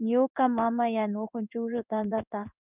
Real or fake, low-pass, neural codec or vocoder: fake; 3.6 kHz; codec, 16 kHz in and 24 kHz out, 1 kbps, XY-Tokenizer